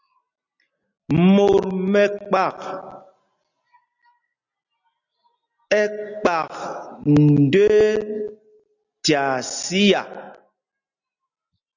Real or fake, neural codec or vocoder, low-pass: real; none; 7.2 kHz